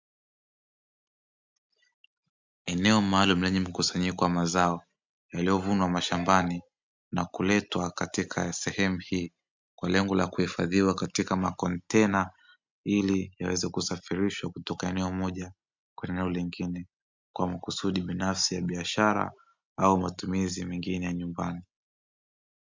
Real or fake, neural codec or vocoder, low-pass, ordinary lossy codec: real; none; 7.2 kHz; MP3, 64 kbps